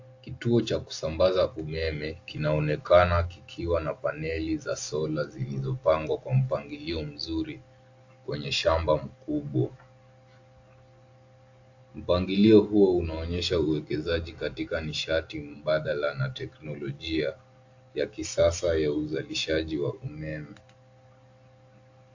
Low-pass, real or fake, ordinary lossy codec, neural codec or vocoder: 7.2 kHz; real; AAC, 48 kbps; none